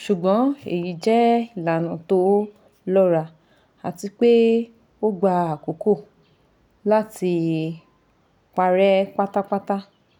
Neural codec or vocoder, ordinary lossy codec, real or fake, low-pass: none; none; real; 19.8 kHz